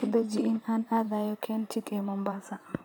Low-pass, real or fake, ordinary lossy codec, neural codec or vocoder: none; fake; none; vocoder, 44.1 kHz, 128 mel bands, Pupu-Vocoder